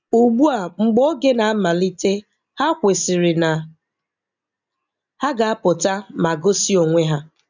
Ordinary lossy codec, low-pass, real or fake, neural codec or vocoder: none; 7.2 kHz; real; none